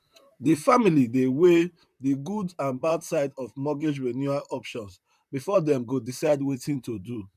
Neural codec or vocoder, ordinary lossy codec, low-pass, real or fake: vocoder, 44.1 kHz, 128 mel bands, Pupu-Vocoder; none; 14.4 kHz; fake